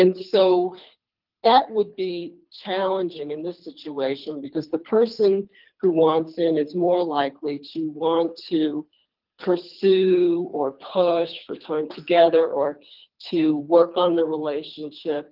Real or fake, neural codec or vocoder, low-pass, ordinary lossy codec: fake; codec, 24 kHz, 3 kbps, HILCodec; 5.4 kHz; Opus, 32 kbps